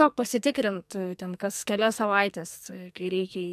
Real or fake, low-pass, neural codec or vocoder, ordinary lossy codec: fake; 14.4 kHz; codec, 44.1 kHz, 2.6 kbps, SNAC; MP3, 96 kbps